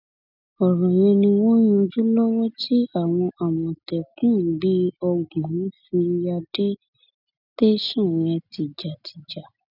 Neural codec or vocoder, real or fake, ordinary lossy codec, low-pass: none; real; none; 5.4 kHz